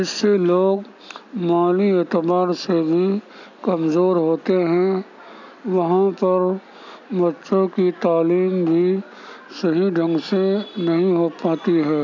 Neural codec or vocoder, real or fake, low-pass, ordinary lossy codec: none; real; 7.2 kHz; none